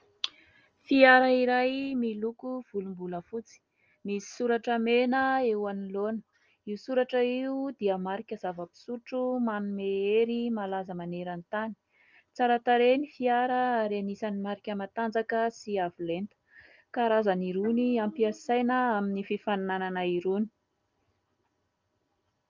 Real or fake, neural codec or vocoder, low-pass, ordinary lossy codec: real; none; 7.2 kHz; Opus, 24 kbps